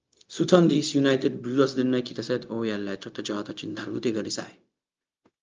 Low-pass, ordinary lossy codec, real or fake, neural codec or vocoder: 7.2 kHz; Opus, 24 kbps; fake; codec, 16 kHz, 0.4 kbps, LongCat-Audio-Codec